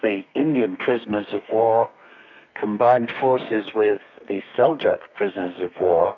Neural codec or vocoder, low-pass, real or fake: codec, 32 kHz, 1.9 kbps, SNAC; 7.2 kHz; fake